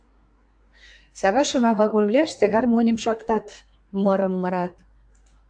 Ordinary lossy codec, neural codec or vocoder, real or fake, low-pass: AAC, 64 kbps; codec, 24 kHz, 1 kbps, SNAC; fake; 9.9 kHz